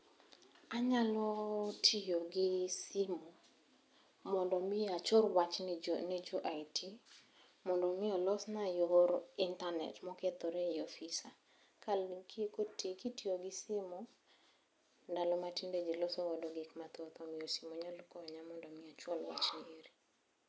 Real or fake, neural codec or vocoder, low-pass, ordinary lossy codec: real; none; none; none